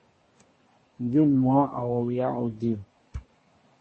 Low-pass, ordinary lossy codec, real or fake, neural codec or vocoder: 10.8 kHz; MP3, 32 kbps; fake; codec, 24 kHz, 1 kbps, SNAC